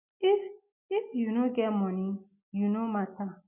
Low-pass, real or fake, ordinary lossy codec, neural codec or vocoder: 3.6 kHz; real; none; none